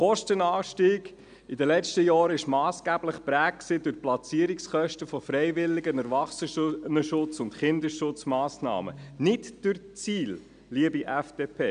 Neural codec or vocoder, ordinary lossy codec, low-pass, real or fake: none; none; 9.9 kHz; real